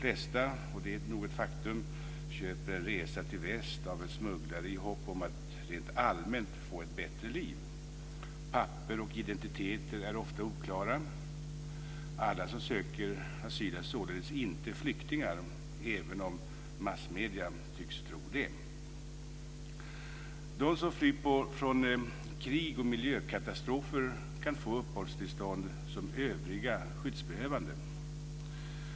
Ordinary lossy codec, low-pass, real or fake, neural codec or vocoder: none; none; real; none